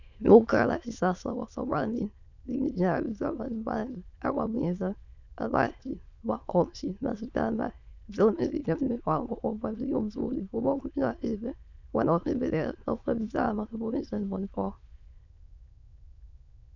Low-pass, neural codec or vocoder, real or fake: 7.2 kHz; autoencoder, 22.05 kHz, a latent of 192 numbers a frame, VITS, trained on many speakers; fake